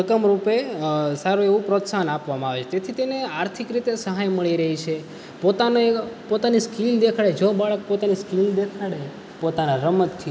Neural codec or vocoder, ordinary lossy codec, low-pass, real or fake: none; none; none; real